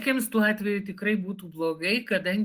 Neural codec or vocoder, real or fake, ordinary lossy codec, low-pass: none; real; Opus, 24 kbps; 14.4 kHz